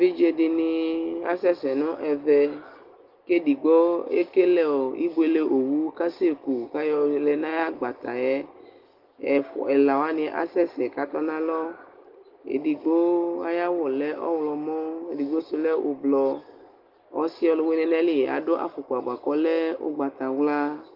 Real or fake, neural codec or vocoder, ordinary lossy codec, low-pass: real; none; Opus, 32 kbps; 5.4 kHz